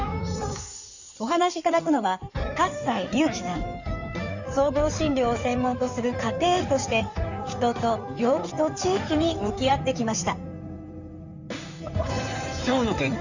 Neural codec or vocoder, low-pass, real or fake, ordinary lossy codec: codec, 16 kHz in and 24 kHz out, 2.2 kbps, FireRedTTS-2 codec; 7.2 kHz; fake; none